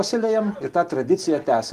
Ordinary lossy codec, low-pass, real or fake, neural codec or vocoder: Opus, 24 kbps; 14.4 kHz; real; none